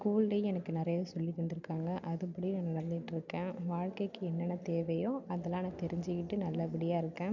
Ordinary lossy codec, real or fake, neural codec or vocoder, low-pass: none; real; none; 7.2 kHz